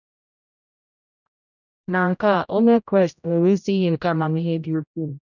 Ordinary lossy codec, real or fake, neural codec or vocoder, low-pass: none; fake; codec, 16 kHz, 0.5 kbps, X-Codec, HuBERT features, trained on general audio; 7.2 kHz